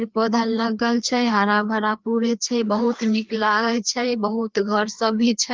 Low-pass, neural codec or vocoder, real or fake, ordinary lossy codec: 7.2 kHz; codec, 16 kHz, 2 kbps, FreqCodec, larger model; fake; Opus, 24 kbps